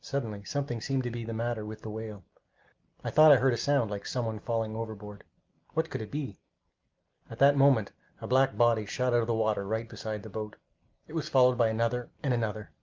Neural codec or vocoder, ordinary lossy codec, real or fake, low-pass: none; Opus, 24 kbps; real; 7.2 kHz